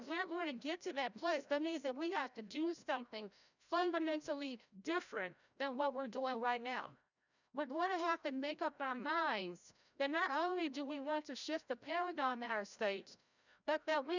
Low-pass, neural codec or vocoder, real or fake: 7.2 kHz; codec, 16 kHz, 0.5 kbps, FreqCodec, larger model; fake